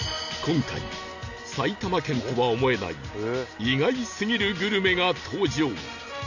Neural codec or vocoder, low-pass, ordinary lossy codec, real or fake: none; 7.2 kHz; none; real